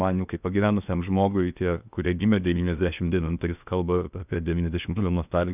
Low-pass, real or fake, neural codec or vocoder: 3.6 kHz; fake; codec, 16 kHz in and 24 kHz out, 0.8 kbps, FocalCodec, streaming, 65536 codes